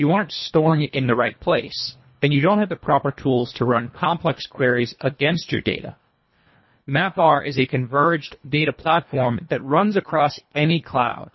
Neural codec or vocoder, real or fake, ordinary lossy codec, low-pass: codec, 24 kHz, 1.5 kbps, HILCodec; fake; MP3, 24 kbps; 7.2 kHz